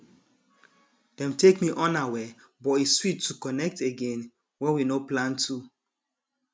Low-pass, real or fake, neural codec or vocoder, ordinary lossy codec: none; real; none; none